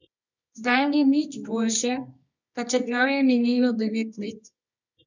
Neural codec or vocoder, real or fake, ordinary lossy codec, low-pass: codec, 24 kHz, 0.9 kbps, WavTokenizer, medium music audio release; fake; none; 7.2 kHz